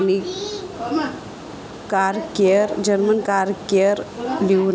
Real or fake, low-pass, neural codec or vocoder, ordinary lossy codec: real; none; none; none